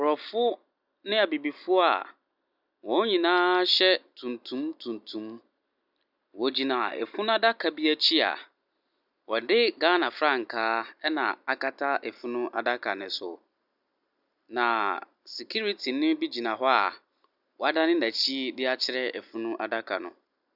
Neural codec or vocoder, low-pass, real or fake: none; 5.4 kHz; real